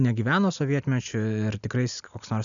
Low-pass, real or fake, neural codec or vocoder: 7.2 kHz; real; none